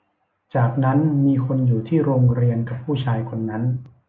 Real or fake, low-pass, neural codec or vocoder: real; 7.2 kHz; none